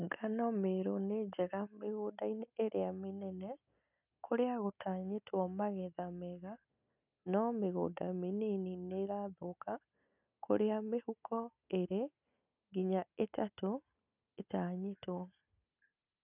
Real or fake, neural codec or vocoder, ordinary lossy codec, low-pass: real; none; none; 3.6 kHz